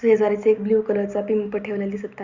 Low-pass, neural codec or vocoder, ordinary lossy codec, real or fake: 7.2 kHz; none; Opus, 64 kbps; real